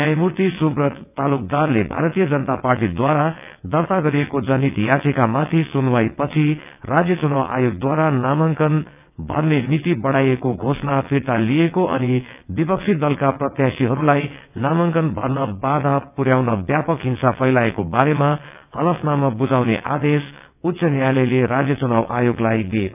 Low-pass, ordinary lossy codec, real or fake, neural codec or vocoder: 3.6 kHz; none; fake; vocoder, 22.05 kHz, 80 mel bands, WaveNeXt